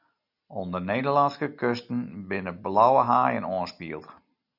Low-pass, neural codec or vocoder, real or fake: 5.4 kHz; none; real